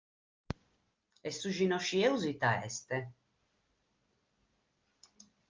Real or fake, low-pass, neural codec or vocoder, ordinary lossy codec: real; 7.2 kHz; none; Opus, 24 kbps